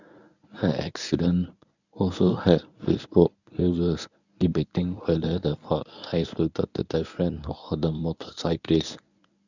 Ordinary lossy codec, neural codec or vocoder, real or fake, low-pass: none; codec, 24 kHz, 0.9 kbps, WavTokenizer, medium speech release version 1; fake; 7.2 kHz